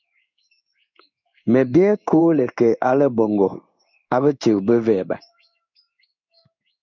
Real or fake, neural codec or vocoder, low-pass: fake; codec, 16 kHz in and 24 kHz out, 1 kbps, XY-Tokenizer; 7.2 kHz